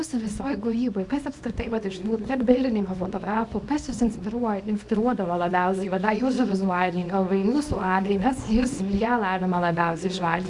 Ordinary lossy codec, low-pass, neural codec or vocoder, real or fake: AAC, 64 kbps; 10.8 kHz; codec, 24 kHz, 0.9 kbps, WavTokenizer, small release; fake